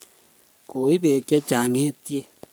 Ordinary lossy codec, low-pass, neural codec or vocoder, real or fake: none; none; codec, 44.1 kHz, 3.4 kbps, Pupu-Codec; fake